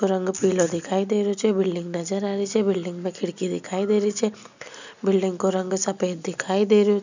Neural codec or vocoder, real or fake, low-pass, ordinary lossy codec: none; real; 7.2 kHz; none